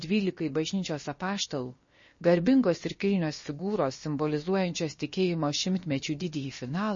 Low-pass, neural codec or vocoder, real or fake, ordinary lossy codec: 7.2 kHz; codec, 16 kHz, about 1 kbps, DyCAST, with the encoder's durations; fake; MP3, 32 kbps